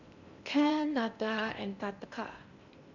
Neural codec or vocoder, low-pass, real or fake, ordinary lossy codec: codec, 16 kHz in and 24 kHz out, 0.8 kbps, FocalCodec, streaming, 65536 codes; 7.2 kHz; fake; none